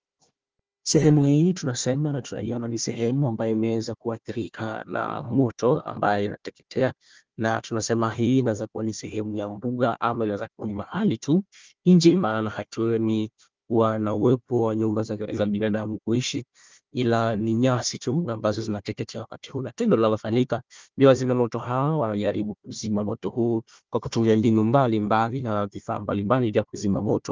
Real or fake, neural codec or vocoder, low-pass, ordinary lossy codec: fake; codec, 16 kHz, 1 kbps, FunCodec, trained on Chinese and English, 50 frames a second; 7.2 kHz; Opus, 24 kbps